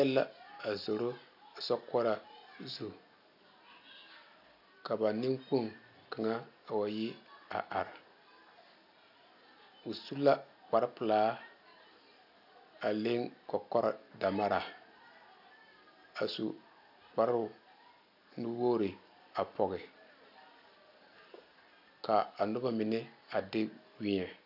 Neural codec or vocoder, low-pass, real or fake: none; 5.4 kHz; real